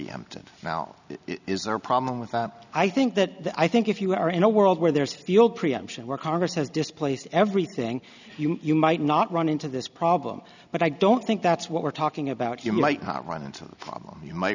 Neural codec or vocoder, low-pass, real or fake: none; 7.2 kHz; real